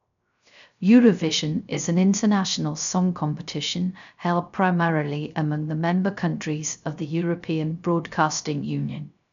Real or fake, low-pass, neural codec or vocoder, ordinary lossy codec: fake; 7.2 kHz; codec, 16 kHz, 0.3 kbps, FocalCodec; MP3, 96 kbps